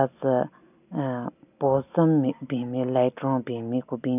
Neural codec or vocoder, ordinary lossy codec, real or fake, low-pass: none; none; real; 3.6 kHz